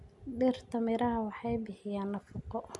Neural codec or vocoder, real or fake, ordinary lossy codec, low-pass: none; real; none; 10.8 kHz